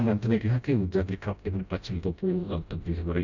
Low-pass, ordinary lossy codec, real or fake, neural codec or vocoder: 7.2 kHz; none; fake; codec, 16 kHz, 0.5 kbps, FreqCodec, smaller model